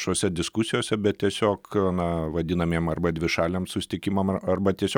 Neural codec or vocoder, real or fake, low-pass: none; real; 19.8 kHz